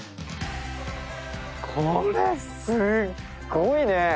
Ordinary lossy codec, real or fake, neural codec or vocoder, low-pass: none; real; none; none